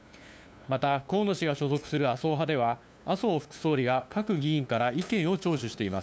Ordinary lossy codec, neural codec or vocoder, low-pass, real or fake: none; codec, 16 kHz, 2 kbps, FunCodec, trained on LibriTTS, 25 frames a second; none; fake